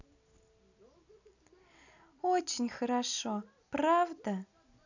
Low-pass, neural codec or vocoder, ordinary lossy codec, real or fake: 7.2 kHz; none; none; real